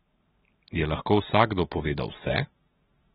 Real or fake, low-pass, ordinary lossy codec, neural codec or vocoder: real; 19.8 kHz; AAC, 16 kbps; none